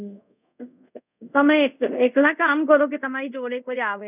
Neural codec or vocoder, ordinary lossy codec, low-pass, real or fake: codec, 24 kHz, 0.5 kbps, DualCodec; none; 3.6 kHz; fake